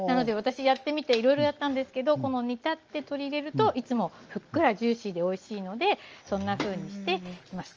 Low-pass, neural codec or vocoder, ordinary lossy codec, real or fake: 7.2 kHz; none; Opus, 24 kbps; real